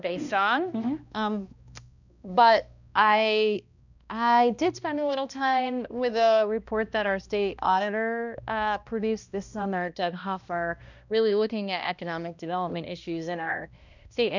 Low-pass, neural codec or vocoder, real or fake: 7.2 kHz; codec, 16 kHz, 1 kbps, X-Codec, HuBERT features, trained on balanced general audio; fake